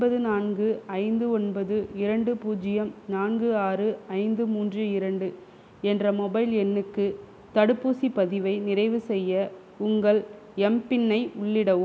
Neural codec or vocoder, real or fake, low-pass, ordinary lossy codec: none; real; none; none